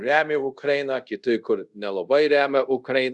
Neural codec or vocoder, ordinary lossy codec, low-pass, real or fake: codec, 24 kHz, 0.5 kbps, DualCodec; Opus, 64 kbps; 10.8 kHz; fake